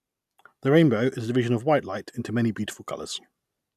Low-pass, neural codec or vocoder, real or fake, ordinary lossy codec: 14.4 kHz; none; real; none